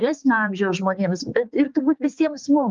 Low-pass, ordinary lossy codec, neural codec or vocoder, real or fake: 7.2 kHz; Opus, 32 kbps; codec, 16 kHz, 4 kbps, X-Codec, HuBERT features, trained on balanced general audio; fake